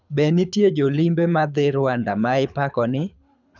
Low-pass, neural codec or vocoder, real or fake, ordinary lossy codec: 7.2 kHz; codec, 24 kHz, 6 kbps, HILCodec; fake; none